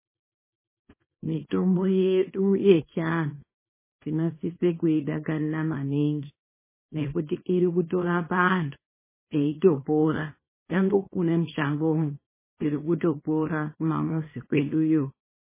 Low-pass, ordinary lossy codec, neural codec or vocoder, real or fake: 3.6 kHz; MP3, 16 kbps; codec, 24 kHz, 0.9 kbps, WavTokenizer, small release; fake